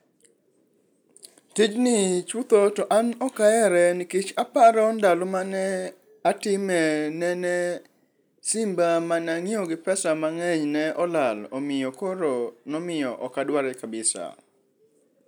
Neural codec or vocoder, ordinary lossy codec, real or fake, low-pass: none; none; real; none